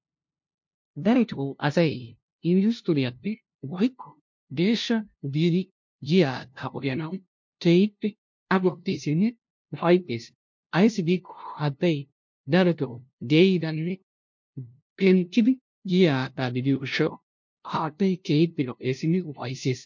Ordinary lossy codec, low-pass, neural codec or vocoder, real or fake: MP3, 48 kbps; 7.2 kHz; codec, 16 kHz, 0.5 kbps, FunCodec, trained on LibriTTS, 25 frames a second; fake